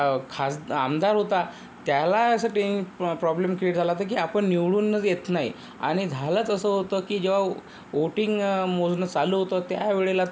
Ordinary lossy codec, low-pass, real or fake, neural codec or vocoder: none; none; real; none